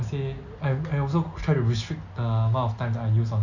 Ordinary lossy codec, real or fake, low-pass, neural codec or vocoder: none; real; 7.2 kHz; none